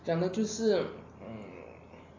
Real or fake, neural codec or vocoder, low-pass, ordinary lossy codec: real; none; 7.2 kHz; AAC, 32 kbps